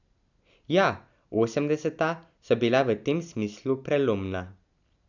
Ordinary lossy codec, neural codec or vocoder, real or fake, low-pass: none; none; real; 7.2 kHz